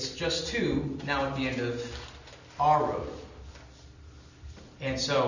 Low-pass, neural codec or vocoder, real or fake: 7.2 kHz; none; real